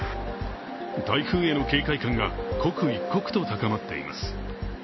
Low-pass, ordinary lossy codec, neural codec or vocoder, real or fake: 7.2 kHz; MP3, 24 kbps; none; real